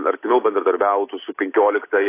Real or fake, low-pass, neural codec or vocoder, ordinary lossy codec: real; 3.6 kHz; none; AAC, 24 kbps